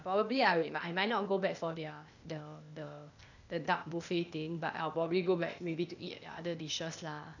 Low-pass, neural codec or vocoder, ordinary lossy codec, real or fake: 7.2 kHz; codec, 16 kHz, 0.8 kbps, ZipCodec; none; fake